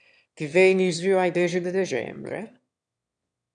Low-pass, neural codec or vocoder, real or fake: 9.9 kHz; autoencoder, 22.05 kHz, a latent of 192 numbers a frame, VITS, trained on one speaker; fake